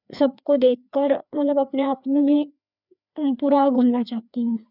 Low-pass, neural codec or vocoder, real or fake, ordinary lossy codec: 5.4 kHz; codec, 16 kHz, 2 kbps, FreqCodec, larger model; fake; none